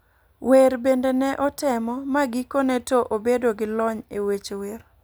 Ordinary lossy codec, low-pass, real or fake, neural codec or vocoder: none; none; real; none